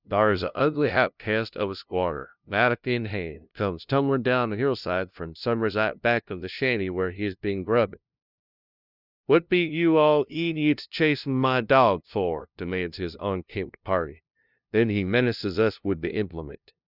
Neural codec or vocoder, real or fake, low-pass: codec, 16 kHz, 0.5 kbps, FunCodec, trained on LibriTTS, 25 frames a second; fake; 5.4 kHz